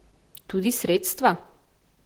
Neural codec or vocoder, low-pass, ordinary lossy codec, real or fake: vocoder, 44.1 kHz, 128 mel bands, Pupu-Vocoder; 19.8 kHz; Opus, 16 kbps; fake